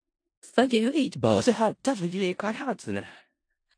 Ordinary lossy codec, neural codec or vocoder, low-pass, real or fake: AAC, 48 kbps; codec, 16 kHz in and 24 kHz out, 0.4 kbps, LongCat-Audio-Codec, four codebook decoder; 9.9 kHz; fake